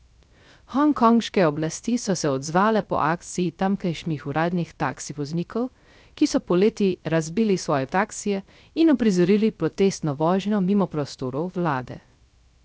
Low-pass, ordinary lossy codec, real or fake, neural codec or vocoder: none; none; fake; codec, 16 kHz, 0.3 kbps, FocalCodec